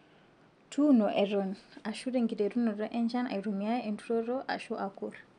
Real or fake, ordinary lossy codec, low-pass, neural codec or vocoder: real; none; 9.9 kHz; none